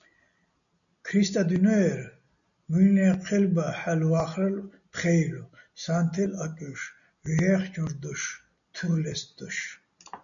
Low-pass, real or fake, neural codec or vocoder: 7.2 kHz; real; none